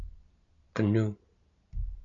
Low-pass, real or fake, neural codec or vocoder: 7.2 kHz; real; none